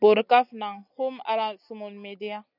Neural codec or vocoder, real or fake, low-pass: none; real; 5.4 kHz